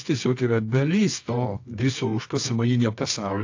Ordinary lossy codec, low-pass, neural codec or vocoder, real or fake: AAC, 48 kbps; 7.2 kHz; codec, 24 kHz, 0.9 kbps, WavTokenizer, medium music audio release; fake